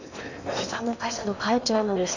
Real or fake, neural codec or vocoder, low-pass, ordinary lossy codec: fake; codec, 16 kHz in and 24 kHz out, 0.8 kbps, FocalCodec, streaming, 65536 codes; 7.2 kHz; none